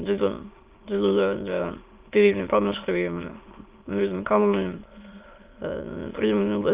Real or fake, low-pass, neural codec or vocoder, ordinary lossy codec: fake; 3.6 kHz; autoencoder, 22.05 kHz, a latent of 192 numbers a frame, VITS, trained on many speakers; Opus, 32 kbps